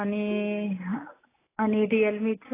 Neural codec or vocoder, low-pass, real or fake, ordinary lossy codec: none; 3.6 kHz; real; AAC, 16 kbps